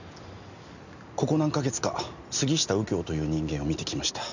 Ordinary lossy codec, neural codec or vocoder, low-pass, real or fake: none; none; 7.2 kHz; real